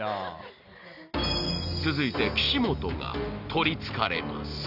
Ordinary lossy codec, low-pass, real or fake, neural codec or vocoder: none; 5.4 kHz; real; none